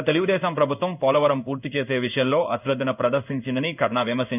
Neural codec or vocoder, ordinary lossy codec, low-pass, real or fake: codec, 16 kHz in and 24 kHz out, 1 kbps, XY-Tokenizer; none; 3.6 kHz; fake